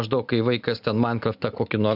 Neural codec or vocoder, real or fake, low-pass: none; real; 5.4 kHz